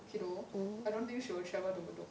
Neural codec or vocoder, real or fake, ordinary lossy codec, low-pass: none; real; none; none